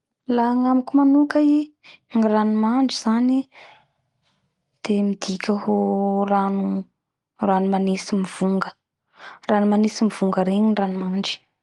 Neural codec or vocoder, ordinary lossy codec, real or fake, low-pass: none; Opus, 24 kbps; real; 10.8 kHz